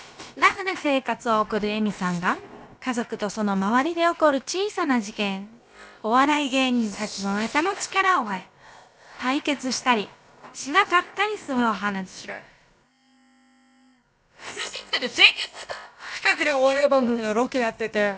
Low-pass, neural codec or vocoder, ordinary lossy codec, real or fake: none; codec, 16 kHz, about 1 kbps, DyCAST, with the encoder's durations; none; fake